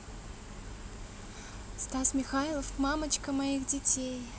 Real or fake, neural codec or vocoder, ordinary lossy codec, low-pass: real; none; none; none